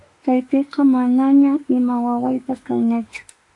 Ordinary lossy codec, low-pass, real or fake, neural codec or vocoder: AAC, 48 kbps; 10.8 kHz; fake; autoencoder, 48 kHz, 32 numbers a frame, DAC-VAE, trained on Japanese speech